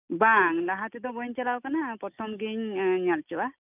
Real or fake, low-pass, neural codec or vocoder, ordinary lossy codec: real; 3.6 kHz; none; none